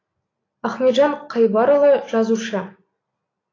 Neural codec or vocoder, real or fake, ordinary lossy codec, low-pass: none; real; AAC, 32 kbps; 7.2 kHz